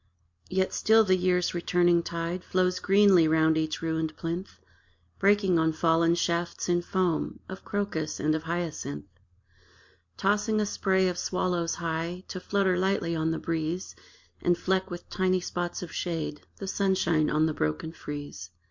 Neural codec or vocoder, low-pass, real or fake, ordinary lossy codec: none; 7.2 kHz; real; MP3, 48 kbps